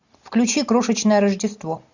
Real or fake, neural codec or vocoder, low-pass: real; none; 7.2 kHz